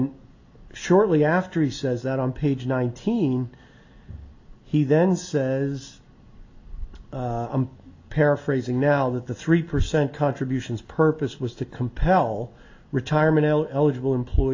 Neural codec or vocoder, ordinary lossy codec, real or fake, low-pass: none; AAC, 48 kbps; real; 7.2 kHz